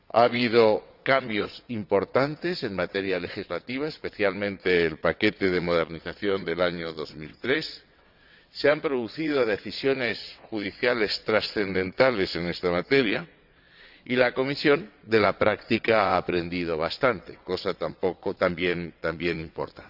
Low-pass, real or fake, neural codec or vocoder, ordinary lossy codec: 5.4 kHz; fake; vocoder, 22.05 kHz, 80 mel bands, WaveNeXt; none